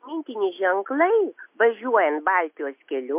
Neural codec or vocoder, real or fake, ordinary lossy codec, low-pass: none; real; MP3, 32 kbps; 3.6 kHz